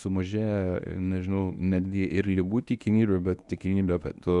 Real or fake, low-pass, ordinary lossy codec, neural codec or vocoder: fake; 10.8 kHz; Opus, 64 kbps; codec, 24 kHz, 0.9 kbps, WavTokenizer, medium speech release version 1